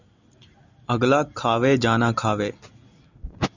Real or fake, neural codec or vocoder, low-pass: real; none; 7.2 kHz